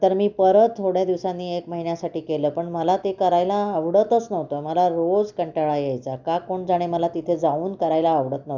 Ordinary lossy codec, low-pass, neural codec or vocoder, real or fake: none; 7.2 kHz; none; real